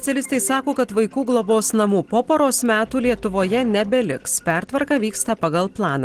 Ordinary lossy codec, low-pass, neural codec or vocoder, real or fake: Opus, 16 kbps; 14.4 kHz; none; real